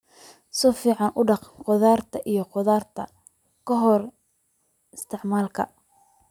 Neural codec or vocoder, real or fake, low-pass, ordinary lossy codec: none; real; 19.8 kHz; none